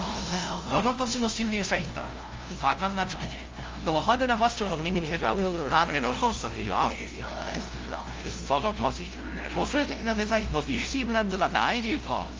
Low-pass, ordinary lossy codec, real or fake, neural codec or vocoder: 7.2 kHz; Opus, 32 kbps; fake; codec, 16 kHz, 0.5 kbps, FunCodec, trained on LibriTTS, 25 frames a second